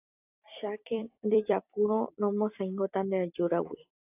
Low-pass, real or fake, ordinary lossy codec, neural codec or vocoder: 3.6 kHz; fake; AAC, 32 kbps; vocoder, 44.1 kHz, 128 mel bands every 256 samples, BigVGAN v2